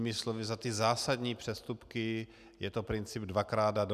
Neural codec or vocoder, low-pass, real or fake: none; 14.4 kHz; real